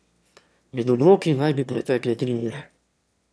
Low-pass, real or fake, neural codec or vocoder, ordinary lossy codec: none; fake; autoencoder, 22.05 kHz, a latent of 192 numbers a frame, VITS, trained on one speaker; none